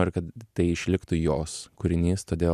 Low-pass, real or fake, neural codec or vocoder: 14.4 kHz; real; none